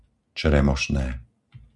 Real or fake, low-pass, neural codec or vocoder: real; 10.8 kHz; none